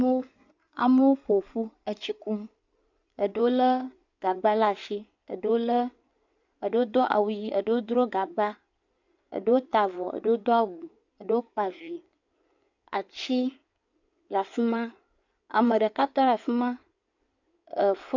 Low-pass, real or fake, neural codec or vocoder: 7.2 kHz; fake; codec, 16 kHz in and 24 kHz out, 2.2 kbps, FireRedTTS-2 codec